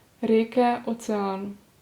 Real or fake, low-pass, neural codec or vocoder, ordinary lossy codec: real; 19.8 kHz; none; Opus, 64 kbps